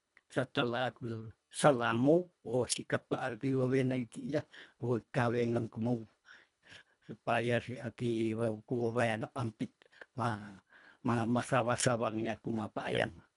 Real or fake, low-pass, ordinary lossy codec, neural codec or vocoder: fake; 10.8 kHz; none; codec, 24 kHz, 1.5 kbps, HILCodec